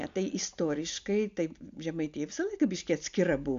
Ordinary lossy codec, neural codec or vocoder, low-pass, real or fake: MP3, 64 kbps; none; 7.2 kHz; real